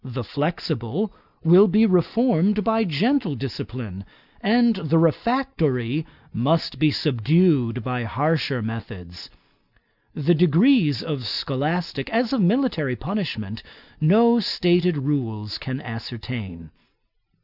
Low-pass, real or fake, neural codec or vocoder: 5.4 kHz; real; none